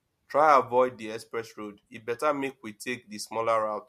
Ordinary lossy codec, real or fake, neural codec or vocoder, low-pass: MP3, 64 kbps; real; none; 14.4 kHz